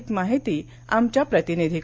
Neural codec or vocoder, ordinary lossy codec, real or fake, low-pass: none; none; real; none